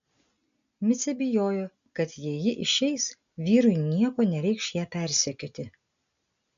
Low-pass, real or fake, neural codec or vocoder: 7.2 kHz; real; none